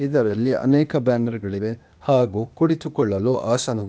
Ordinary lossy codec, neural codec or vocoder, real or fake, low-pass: none; codec, 16 kHz, 0.8 kbps, ZipCodec; fake; none